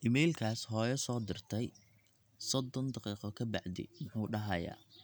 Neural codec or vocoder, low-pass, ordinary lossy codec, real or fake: none; none; none; real